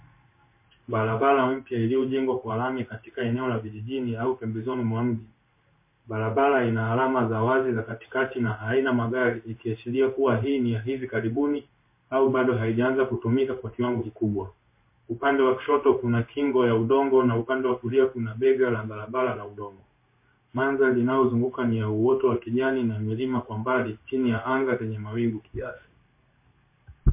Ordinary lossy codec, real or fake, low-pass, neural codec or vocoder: MP3, 24 kbps; fake; 3.6 kHz; codec, 16 kHz in and 24 kHz out, 1 kbps, XY-Tokenizer